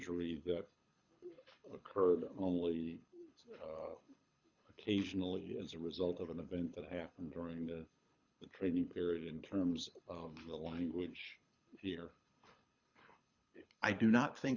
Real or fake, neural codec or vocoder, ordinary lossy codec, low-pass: fake; codec, 24 kHz, 6 kbps, HILCodec; Opus, 64 kbps; 7.2 kHz